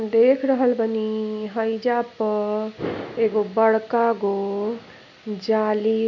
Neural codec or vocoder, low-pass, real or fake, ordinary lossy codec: none; 7.2 kHz; real; none